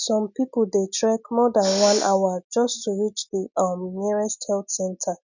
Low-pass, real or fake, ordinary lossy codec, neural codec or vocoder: 7.2 kHz; real; none; none